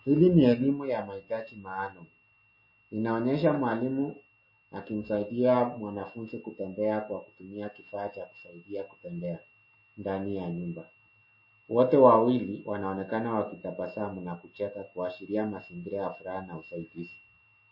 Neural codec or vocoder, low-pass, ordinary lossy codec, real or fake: none; 5.4 kHz; MP3, 24 kbps; real